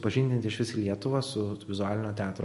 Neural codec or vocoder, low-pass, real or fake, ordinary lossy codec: none; 14.4 kHz; real; MP3, 48 kbps